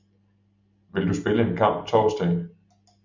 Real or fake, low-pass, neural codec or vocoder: real; 7.2 kHz; none